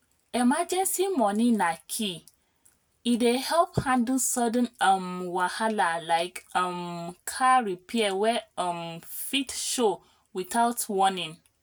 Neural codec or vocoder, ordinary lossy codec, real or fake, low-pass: none; none; real; none